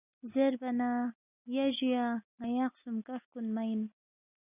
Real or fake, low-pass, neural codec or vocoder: real; 3.6 kHz; none